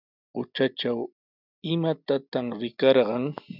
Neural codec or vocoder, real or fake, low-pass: none; real; 5.4 kHz